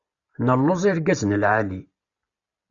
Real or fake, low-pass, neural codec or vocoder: real; 7.2 kHz; none